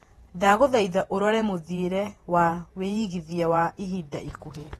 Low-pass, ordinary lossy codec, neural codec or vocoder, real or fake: 19.8 kHz; AAC, 32 kbps; vocoder, 48 kHz, 128 mel bands, Vocos; fake